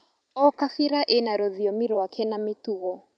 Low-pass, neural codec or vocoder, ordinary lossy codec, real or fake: 9.9 kHz; vocoder, 44.1 kHz, 128 mel bands every 512 samples, BigVGAN v2; none; fake